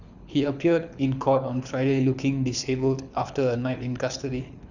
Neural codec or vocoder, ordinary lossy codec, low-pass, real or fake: codec, 24 kHz, 6 kbps, HILCodec; none; 7.2 kHz; fake